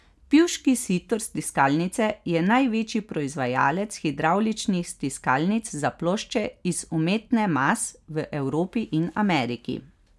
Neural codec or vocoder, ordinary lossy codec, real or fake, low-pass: none; none; real; none